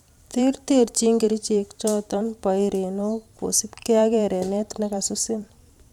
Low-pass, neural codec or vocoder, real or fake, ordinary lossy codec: 19.8 kHz; vocoder, 44.1 kHz, 128 mel bands every 512 samples, BigVGAN v2; fake; none